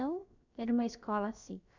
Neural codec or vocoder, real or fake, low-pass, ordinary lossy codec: codec, 16 kHz, about 1 kbps, DyCAST, with the encoder's durations; fake; 7.2 kHz; none